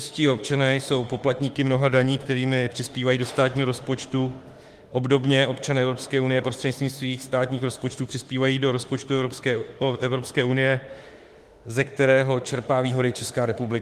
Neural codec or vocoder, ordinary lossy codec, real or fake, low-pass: autoencoder, 48 kHz, 32 numbers a frame, DAC-VAE, trained on Japanese speech; Opus, 24 kbps; fake; 14.4 kHz